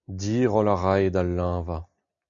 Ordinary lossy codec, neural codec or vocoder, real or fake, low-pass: MP3, 96 kbps; none; real; 7.2 kHz